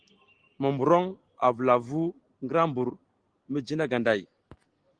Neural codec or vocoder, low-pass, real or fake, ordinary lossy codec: none; 9.9 kHz; real; Opus, 16 kbps